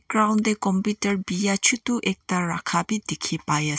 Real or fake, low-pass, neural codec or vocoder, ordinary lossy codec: real; none; none; none